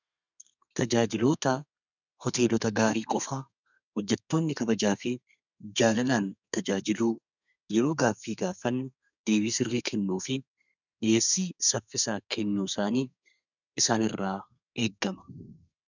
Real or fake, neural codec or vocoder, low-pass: fake; codec, 32 kHz, 1.9 kbps, SNAC; 7.2 kHz